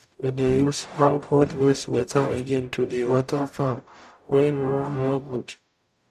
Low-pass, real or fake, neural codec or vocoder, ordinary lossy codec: 14.4 kHz; fake; codec, 44.1 kHz, 0.9 kbps, DAC; none